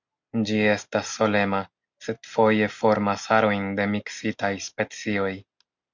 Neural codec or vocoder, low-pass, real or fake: none; 7.2 kHz; real